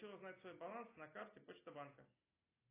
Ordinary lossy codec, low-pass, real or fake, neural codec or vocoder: AAC, 16 kbps; 3.6 kHz; real; none